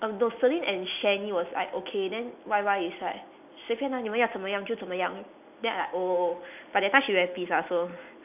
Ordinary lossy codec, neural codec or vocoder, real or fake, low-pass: none; none; real; 3.6 kHz